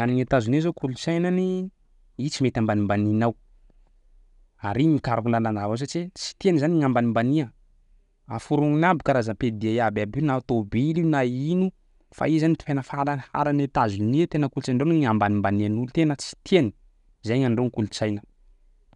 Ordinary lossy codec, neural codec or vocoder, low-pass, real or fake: none; none; 10.8 kHz; real